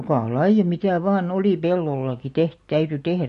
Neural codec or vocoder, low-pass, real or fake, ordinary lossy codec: none; 14.4 kHz; real; MP3, 48 kbps